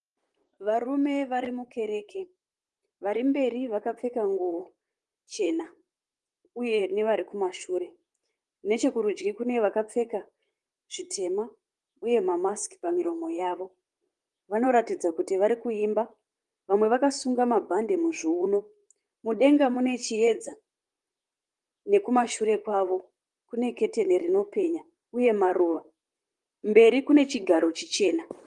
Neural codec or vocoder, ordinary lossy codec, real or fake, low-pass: vocoder, 44.1 kHz, 128 mel bands, Pupu-Vocoder; Opus, 24 kbps; fake; 10.8 kHz